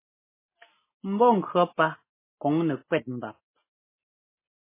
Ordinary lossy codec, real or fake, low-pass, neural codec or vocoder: MP3, 16 kbps; real; 3.6 kHz; none